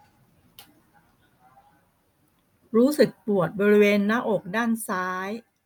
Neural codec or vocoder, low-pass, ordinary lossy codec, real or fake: none; none; none; real